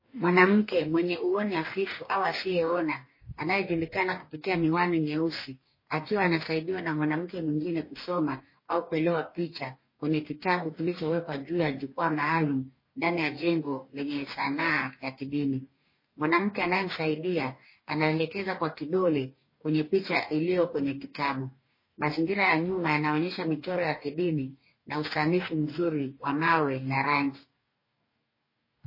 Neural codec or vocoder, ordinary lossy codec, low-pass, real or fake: codec, 44.1 kHz, 2.6 kbps, DAC; MP3, 24 kbps; 5.4 kHz; fake